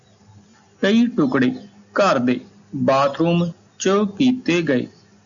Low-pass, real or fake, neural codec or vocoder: 7.2 kHz; real; none